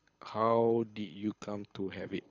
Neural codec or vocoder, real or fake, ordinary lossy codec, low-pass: codec, 24 kHz, 6 kbps, HILCodec; fake; none; 7.2 kHz